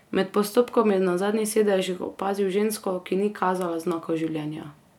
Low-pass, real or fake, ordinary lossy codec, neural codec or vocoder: 19.8 kHz; real; none; none